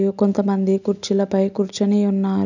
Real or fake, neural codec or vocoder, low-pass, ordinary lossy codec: real; none; 7.2 kHz; none